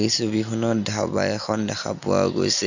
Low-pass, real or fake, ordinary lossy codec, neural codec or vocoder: 7.2 kHz; real; none; none